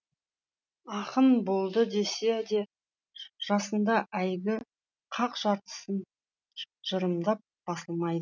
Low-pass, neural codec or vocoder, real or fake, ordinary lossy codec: 7.2 kHz; none; real; none